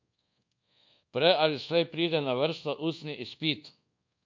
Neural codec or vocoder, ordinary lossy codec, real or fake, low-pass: codec, 24 kHz, 1.2 kbps, DualCodec; MP3, 48 kbps; fake; 7.2 kHz